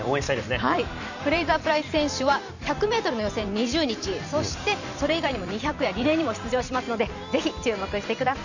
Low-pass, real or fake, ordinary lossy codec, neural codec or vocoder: 7.2 kHz; fake; none; vocoder, 44.1 kHz, 128 mel bands every 512 samples, BigVGAN v2